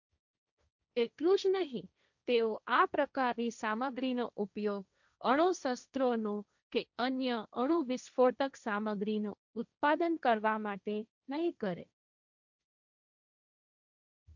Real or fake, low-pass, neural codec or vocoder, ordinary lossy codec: fake; 7.2 kHz; codec, 16 kHz, 1.1 kbps, Voila-Tokenizer; none